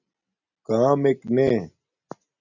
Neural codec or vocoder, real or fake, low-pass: none; real; 7.2 kHz